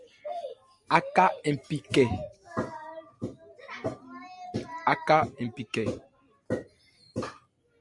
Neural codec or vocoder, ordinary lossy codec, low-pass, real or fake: none; MP3, 64 kbps; 10.8 kHz; real